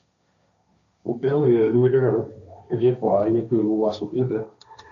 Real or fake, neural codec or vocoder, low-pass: fake; codec, 16 kHz, 1.1 kbps, Voila-Tokenizer; 7.2 kHz